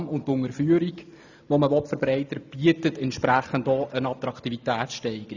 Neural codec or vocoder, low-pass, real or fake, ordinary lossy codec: none; 7.2 kHz; real; none